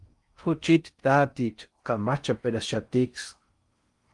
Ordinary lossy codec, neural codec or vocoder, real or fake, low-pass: Opus, 32 kbps; codec, 16 kHz in and 24 kHz out, 0.6 kbps, FocalCodec, streaming, 4096 codes; fake; 10.8 kHz